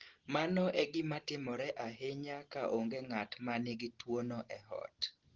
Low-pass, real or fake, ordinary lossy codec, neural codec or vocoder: 7.2 kHz; real; Opus, 16 kbps; none